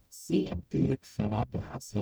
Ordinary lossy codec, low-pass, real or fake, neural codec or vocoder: none; none; fake; codec, 44.1 kHz, 0.9 kbps, DAC